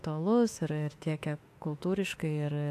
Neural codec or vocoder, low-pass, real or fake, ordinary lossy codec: autoencoder, 48 kHz, 32 numbers a frame, DAC-VAE, trained on Japanese speech; 14.4 kHz; fake; AAC, 64 kbps